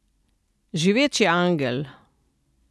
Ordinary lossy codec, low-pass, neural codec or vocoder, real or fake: none; none; none; real